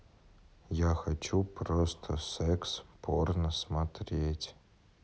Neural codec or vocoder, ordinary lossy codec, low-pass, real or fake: none; none; none; real